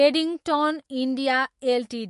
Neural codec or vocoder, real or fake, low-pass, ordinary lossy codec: autoencoder, 48 kHz, 128 numbers a frame, DAC-VAE, trained on Japanese speech; fake; 14.4 kHz; MP3, 48 kbps